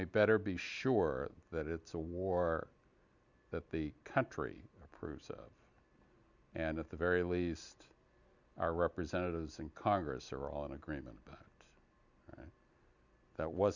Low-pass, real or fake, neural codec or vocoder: 7.2 kHz; real; none